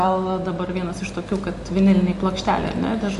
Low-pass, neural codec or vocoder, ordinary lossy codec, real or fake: 14.4 kHz; none; MP3, 48 kbps; real